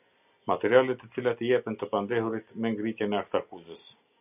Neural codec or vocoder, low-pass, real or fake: none; 3.6 kHz; real